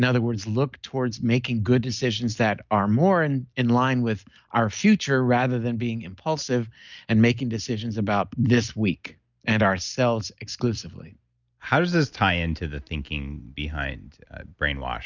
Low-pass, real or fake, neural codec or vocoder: 7.2 kHz; real; none